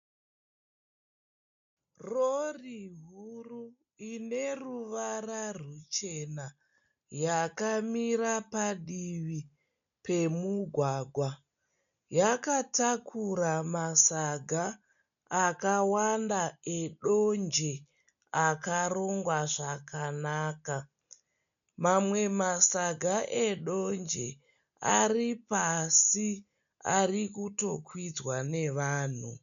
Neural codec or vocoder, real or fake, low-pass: none; real; 7.2 kHz